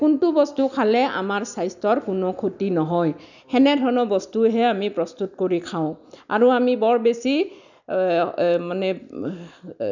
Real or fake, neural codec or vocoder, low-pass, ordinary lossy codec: real; none; 7.2 kHz; none